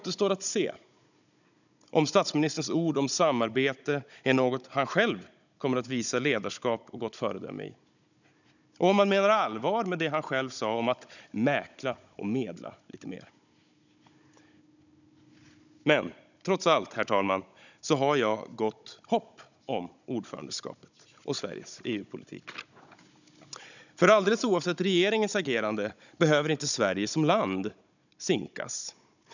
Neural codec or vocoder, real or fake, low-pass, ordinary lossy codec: codec, 16 kHz, 16 kbps, FunCodec, trained on Chinese and English, 50 frames a second; fake; 7.2 kHz; none